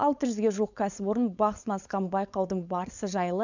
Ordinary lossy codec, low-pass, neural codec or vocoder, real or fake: none; 7.2 kHz; codec, 16 kHz, 4.8 kbps, FACodec; fake